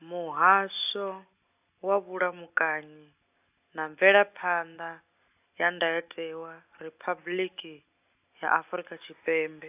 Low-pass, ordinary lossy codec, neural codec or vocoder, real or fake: 3.6 kHz; AAC, 32 kbps; none; real